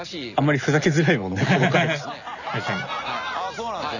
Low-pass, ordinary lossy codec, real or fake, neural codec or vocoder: 7.2 kHz; none; real; none